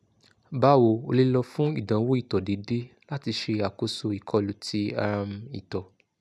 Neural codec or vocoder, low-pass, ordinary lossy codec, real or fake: none; none; none; real